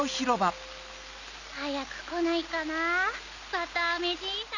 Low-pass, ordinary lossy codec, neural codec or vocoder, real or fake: 7.2 kHz; none; none; real